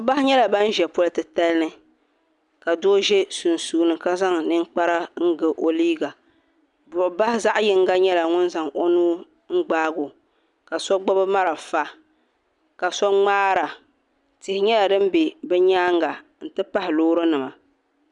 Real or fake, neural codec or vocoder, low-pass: real; none; 10.8 kHz